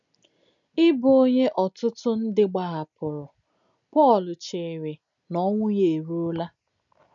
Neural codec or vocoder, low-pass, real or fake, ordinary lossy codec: none; 7.2 kHz; real; none